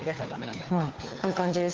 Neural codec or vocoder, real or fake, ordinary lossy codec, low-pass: codec, 16 kHz, 4 kbps, FunCodec, trained on LibriTTS, 50 frames a second; fake; Opus, 16 kbps; 7.2 kHz